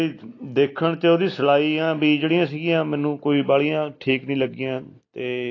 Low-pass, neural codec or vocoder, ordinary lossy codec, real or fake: 7.2 kHz; none; AAC, 32 kbps; real